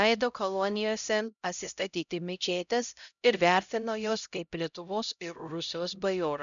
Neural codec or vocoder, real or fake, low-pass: codec, 16 kHz, 0.5 kbps, X-Codec, HuBERT features, trained on LibriSpeech; fake; 7.2 kHz